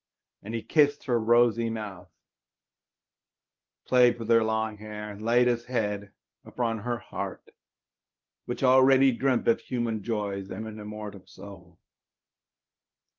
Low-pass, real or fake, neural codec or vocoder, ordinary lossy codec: 7.2 kHz; fake; codec, 24 kHz, 0.9 kbps, WavTokenizer, medium speech release version 1; Opus, 24 kbps